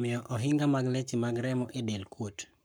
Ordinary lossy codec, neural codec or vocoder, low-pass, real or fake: none; codec, 44.1 kHz, 7.8 kbps, Pupu-Codec; none; fake